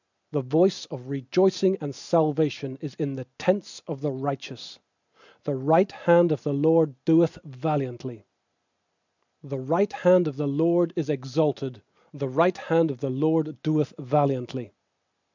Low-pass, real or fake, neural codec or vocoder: 7.2 kHz; real; none